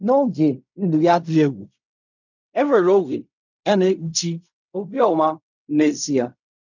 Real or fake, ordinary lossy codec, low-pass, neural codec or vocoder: fake; none; 7.2 kHz; codec, 16 kHz in and 24 kHz out, 0.4 kbps, LongCat-Audio-Codec, fine tuned four codebook decoder